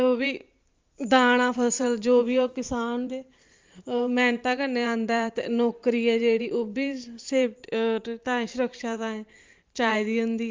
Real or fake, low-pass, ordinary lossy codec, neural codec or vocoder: fake; 7.2 kHz; Opus, 32 kbps; vocoder, 44.1 kHz, 128 mel bands every 512 samples, BigVGAN v2